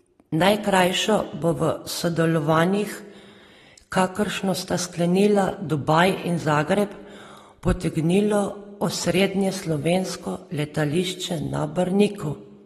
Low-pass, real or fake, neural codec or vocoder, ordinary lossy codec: 19.8 kHz; real; none; AAC, 32 kbps